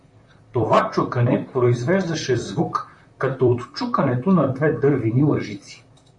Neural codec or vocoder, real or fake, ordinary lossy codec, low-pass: vocoder, 44.1 kHz, 128 mel bands, Pupu-Vocoder; fake; MP3, 48 kbps; 10.8 kHz